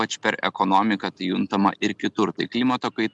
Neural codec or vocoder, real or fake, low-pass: none; real; 10.8 kHz